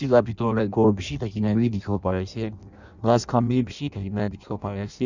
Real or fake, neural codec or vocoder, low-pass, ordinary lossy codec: fake; codec, 16 kHz in and 24 kHz out, 0.6 kbps, FireRedTTS-2 codec; 7.2 kHz; none